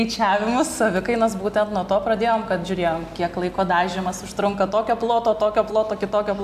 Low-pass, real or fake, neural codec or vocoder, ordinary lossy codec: 14.4 kHz; real; none; AAC, 96 kbps